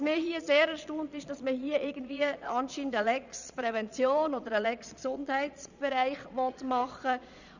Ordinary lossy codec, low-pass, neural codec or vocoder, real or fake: none; 7.2 kHz; vocoder, 22.05 kHz, 80 mel bands, Vocos; fake